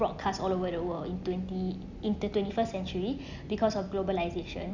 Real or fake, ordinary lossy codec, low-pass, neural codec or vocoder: real; none; 7.2 kHz; none